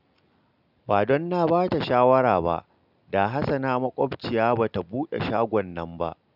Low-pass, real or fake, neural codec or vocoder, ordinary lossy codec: 5.4 kHz; real; none; none